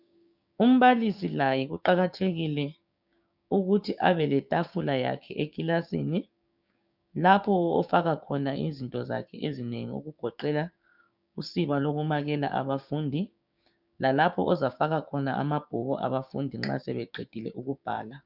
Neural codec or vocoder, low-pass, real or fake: codec, 16 kHz, 6 kbps, DAC; 5.4 kHz; fake